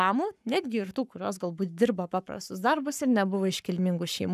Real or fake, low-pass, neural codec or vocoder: fake; 14.4 kHz; codec, 44.1 kHz, 7.8 kbps, Pupu-Codec